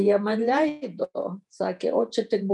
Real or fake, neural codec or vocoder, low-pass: fake; vocoder, 48 kHz, 128 mel bands, Vocos; 10.8 kHz